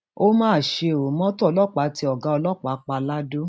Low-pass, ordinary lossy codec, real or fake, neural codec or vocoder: none; none; real; none